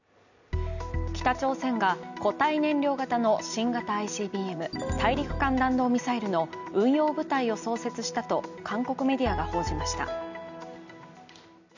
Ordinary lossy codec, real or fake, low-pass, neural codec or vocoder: none; real; 7.2 kHz; none